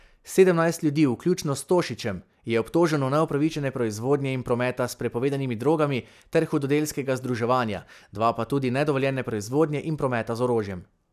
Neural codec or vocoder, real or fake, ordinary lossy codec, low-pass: none; real; none; 14.4 kHz